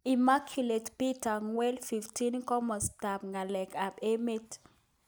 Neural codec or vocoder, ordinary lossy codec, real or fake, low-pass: none; none; real; none